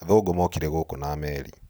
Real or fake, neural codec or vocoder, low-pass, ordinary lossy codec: real; none; none; none